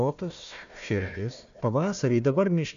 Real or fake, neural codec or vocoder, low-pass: fake; codec, 16 kHz, 1 kbps, FunCodec, trained on Chinese and English, 50 frames a second; 7.2 kHz